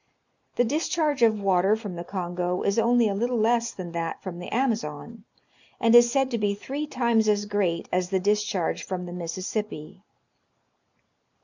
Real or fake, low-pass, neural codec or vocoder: real; 7.2 kHz; none